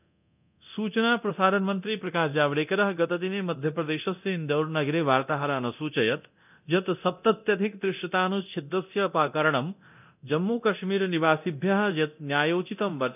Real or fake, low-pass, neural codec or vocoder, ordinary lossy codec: fake; 3.6 kHz; codec, 24 kHz, 0.9 kbps, DualCodec; none